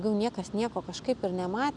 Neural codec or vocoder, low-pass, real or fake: none; 10.8 kHz; real